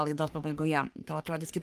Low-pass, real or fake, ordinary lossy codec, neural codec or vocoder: 14.4 kHz; fake; Opus, 32 kbps; codec, 44.1 kHz, 3.4 kbps, Pupu-Codec